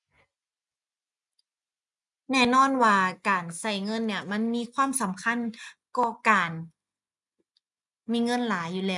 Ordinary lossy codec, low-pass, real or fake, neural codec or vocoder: none; 10.8 kHz; real; none